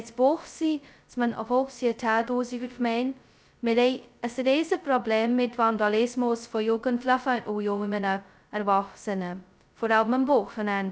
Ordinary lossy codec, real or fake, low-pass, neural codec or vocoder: none; fake; none; codec, 16 kHz, 0.2 kbps, FocalCodec